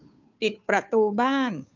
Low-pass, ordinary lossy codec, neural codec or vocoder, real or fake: 7.2 kHz; none; codec, 16 kHz, 2 kbps, FunCodec, trained on Chinese and English, 25 frames a second; fake